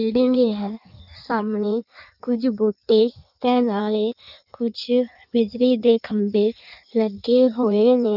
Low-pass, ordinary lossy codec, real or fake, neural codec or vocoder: 5.4 kHz; none; fake; codec, 16 kHz in and 24 kHz out, 1.1 kbps, FireRedTTS-2 codec